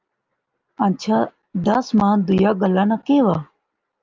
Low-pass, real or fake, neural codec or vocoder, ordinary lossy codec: 7.2 kHz; real; none; Opus, 24 kbps